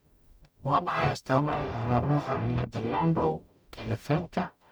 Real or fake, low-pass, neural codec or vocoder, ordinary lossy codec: fake; none; codec, 44.1 kHz, 0.9 kbps, DAC; none